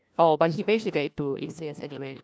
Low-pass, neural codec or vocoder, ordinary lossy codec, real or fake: none; codec, 16 kHz, 1 kbps, FunCodec, trained on LibriTTS, 50 frames a second; none; fake